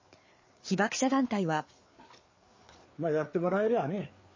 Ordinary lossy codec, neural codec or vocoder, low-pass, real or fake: MP3, 32 kbps; codec, 16 kHz, 4 kbps, FreqCodec, larger model; 7.2 kHz; fake